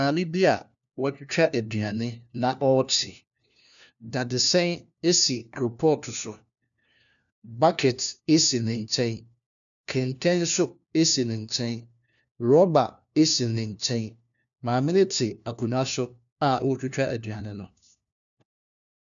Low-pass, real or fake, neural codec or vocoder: 7.2 kHz; fake; codec, 16 kHz, 1 kbps, FunCodec, trained on LibriTTS, 50 frames a second